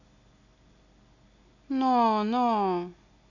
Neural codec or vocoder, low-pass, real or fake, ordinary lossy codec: none; 7.2 kHz; real; none